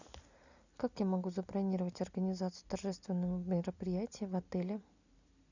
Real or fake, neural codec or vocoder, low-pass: real; none; 7.2 kHz